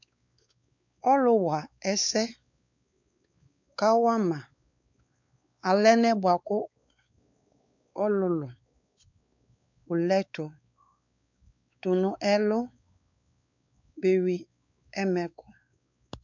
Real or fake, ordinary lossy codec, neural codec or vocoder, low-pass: fake; MP3, 64 kbps; codec, 16 kHz, 4 kbps, X-Codec, WavLM features, trained on Multilingual LibriSpeech; 7.2 kHz